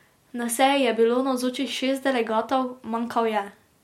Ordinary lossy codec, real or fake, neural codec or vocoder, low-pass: MP3, 64 kbps; real; none; 19.8 kHz